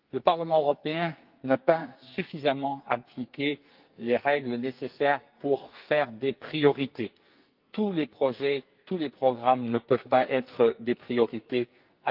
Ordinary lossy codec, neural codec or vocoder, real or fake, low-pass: Opus, 32 kbps; codec, 44.1 kHz, 2.6 kbps, SNAC; fake; 5.4 kHz